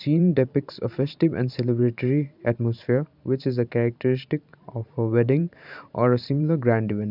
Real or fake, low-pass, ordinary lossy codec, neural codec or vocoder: fake; 5.4 kHz; none; vocoder, 44.1 kHz, 128 mel bands every 512 samples, BigVGAN v2